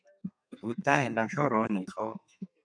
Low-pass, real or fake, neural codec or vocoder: 9.9 kHz; fake; codec, 32 kHz, 1.9 kbps, SNAC